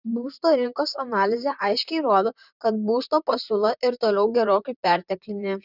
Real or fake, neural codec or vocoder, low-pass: fake; vocoder, 44.1 kHz, 128 mel bands, Pupu-Vocoder; 5.4 kHz